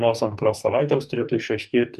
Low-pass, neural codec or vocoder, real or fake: 14.4 kHz; codec, 44.1 kHz, 2.6 kbps, DAC; fake